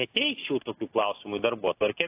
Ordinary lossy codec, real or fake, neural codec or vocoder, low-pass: AAC, 24 kbps; real; none; 3.6 kHz